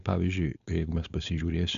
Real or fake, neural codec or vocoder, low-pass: fake; codec, 16 kHz, 4.8 kbps, FACodec; 7.2 kHz